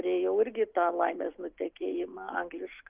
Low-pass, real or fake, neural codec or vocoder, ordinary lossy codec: 3.6 kHz; real; none; Opus, 24 kbps